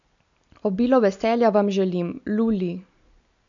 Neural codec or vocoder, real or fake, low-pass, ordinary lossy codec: none; real; 7.2 kHz; none